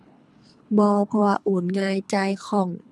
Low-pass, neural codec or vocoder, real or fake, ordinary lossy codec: none; codec, 24 kHz, 3 kbps, HILCodec; fake; none